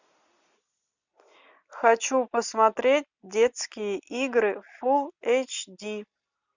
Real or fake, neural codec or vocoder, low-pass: real; none; 7.2 kHz